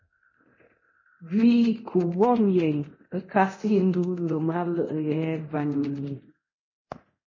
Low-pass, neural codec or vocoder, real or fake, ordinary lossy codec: 7.2 kHz; codec, 24 kHz, 0.9 kbps, DualCodec; fake; MP3, 32 kbps